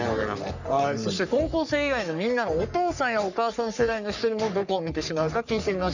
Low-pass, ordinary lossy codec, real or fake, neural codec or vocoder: 7.2 kHz; none; fake; codec, 44.1 kHz, 3.4 kbps, Pupu-Codec